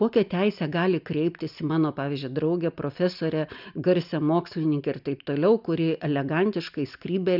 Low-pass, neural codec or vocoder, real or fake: 5.4 kHz; none; real